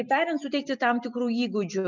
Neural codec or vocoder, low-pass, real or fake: none; 7.2 kHz; real